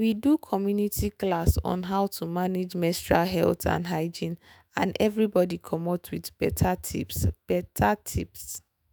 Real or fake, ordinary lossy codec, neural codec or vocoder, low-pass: fake; none; autoencoder, 48 kHz, 128 numbers a frame, DAC-VAE, trained on Japanese speech; none